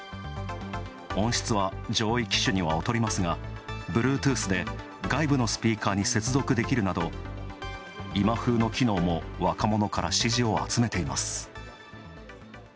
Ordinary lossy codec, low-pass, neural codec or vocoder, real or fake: none; none; none; real